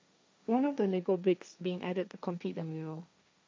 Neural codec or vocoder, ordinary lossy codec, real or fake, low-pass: codec, 16 kHz, 1.1 kbps, Voila-Tokenizer; none; fake; none